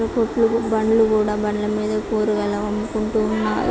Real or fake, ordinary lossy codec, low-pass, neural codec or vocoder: real; none; none; none